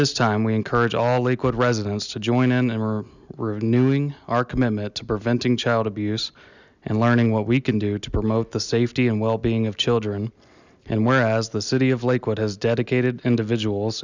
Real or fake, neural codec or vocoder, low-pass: real; none; 7.2 kHz